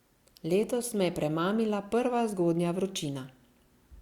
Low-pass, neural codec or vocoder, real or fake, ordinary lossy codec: 19.8 kHz; none; real; Opus, 64 kbps